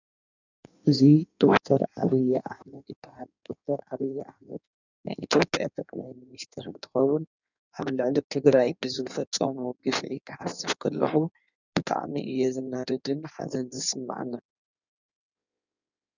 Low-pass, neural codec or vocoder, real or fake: 7.2 kHz; codec, 16 kHz in and 24 kHz out, 1.1 kbps, FireRedTTS-2 codec; fake